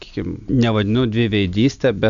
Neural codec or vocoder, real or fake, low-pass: none; real; 7.2 kHz